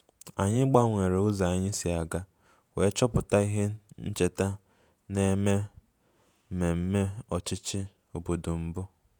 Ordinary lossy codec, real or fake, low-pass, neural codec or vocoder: none; fake; none; vocoder, 48 kHz, 128 mel bands, Vocos